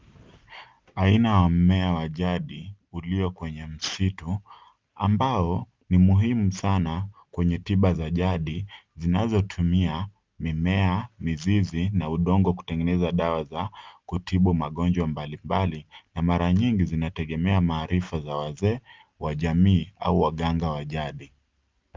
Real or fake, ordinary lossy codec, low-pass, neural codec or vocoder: real; Opus, 32 kbps; 7.2 kHz; none